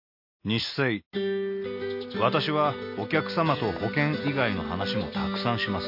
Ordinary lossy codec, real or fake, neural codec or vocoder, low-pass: MP3, 32 kbps; real; none; 5.4 kHz